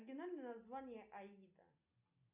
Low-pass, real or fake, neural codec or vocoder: 3.6 kHz; real; none